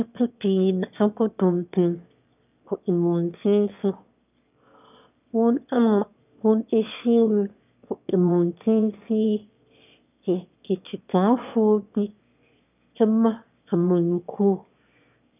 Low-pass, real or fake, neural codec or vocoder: 3.6 kHz; fake; autoencoder, 22.05 kHz, a latent of 192 numbers a frame, VITS, trained on one speaker